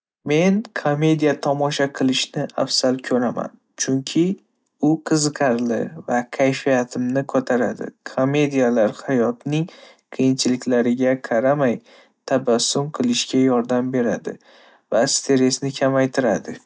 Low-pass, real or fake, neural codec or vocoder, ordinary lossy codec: none; real; none; none